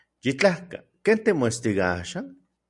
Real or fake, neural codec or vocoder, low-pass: real; none; 10.8 kHz